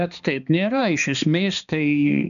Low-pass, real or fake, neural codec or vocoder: 7.2 kHz; fake; codec, 16 kHz, 2 kbps, X-Codec, HuBERT features, trained on balanced general audio